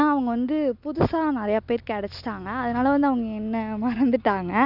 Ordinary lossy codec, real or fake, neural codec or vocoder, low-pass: none; real; none; 5.4 kHz